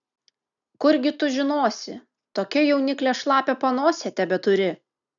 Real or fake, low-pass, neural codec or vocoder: real; 7.2 kHz; none